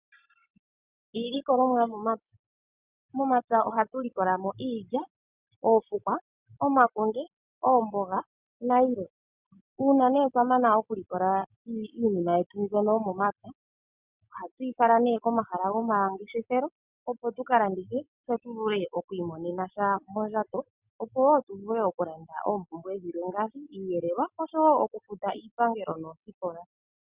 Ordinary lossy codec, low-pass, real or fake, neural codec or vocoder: Opus, 64 kbps; 3.6 kHz; real; none